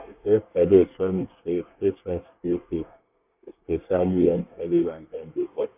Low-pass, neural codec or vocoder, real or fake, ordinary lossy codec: 3.6 kHz; codec, 24 kHz, 1 kbps, SNAC; fake; AAC, 32 kbps